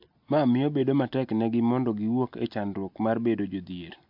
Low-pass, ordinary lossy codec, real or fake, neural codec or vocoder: 5.4 kHz; MP3, 48 kbps; real; none